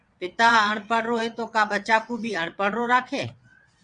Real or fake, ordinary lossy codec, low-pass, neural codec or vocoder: fake; Opus, 64 kbps; 9.9 kHz; vocoder, 22.05 kHz, 80 mel bands, WaveNeXt